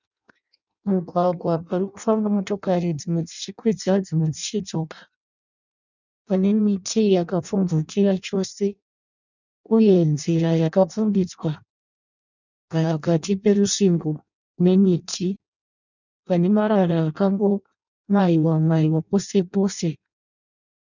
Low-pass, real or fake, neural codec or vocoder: 7.2 kHz; fake; codec, 16 kHz in and 24 kHz out, 0.6 kbps, FireRedTTS-2 codec